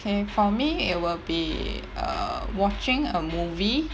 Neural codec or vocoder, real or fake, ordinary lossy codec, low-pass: none; real; none; none